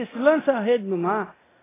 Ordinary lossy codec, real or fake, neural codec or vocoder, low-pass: AAC, 16 kbps; fake; codec, 24 kHz, 0.9 kbps, DualCodec; 3.6 kHz